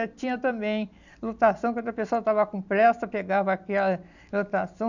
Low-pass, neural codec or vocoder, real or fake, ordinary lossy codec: 7.2 kHz; none; real; none